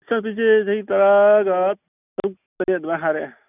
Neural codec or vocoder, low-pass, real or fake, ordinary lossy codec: none; 3.6 kHz; real; none